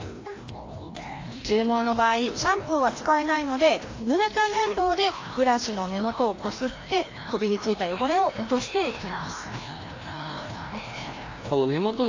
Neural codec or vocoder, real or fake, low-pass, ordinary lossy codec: codec, 16 kHz, 1 kbps, FreqCodec, larger model; fake; 7.2 kHz; AAC, 32 kbps